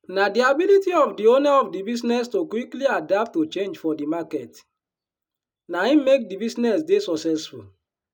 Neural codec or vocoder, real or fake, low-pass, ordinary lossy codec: none; real; 19.8 kHz; none